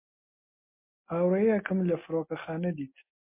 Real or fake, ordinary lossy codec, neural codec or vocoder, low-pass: real; MP3, 24 kbps; none; 3.6 kHz